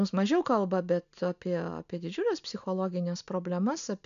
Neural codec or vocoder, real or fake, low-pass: none; real; 7.2 kHz